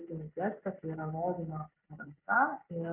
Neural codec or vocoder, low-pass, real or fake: none; 3.6 kHz; real